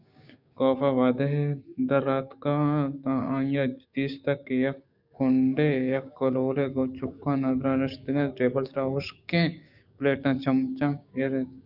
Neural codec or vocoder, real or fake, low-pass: codec, 16 kHz, 6 kbps, DAC; fake; 5.4 kHz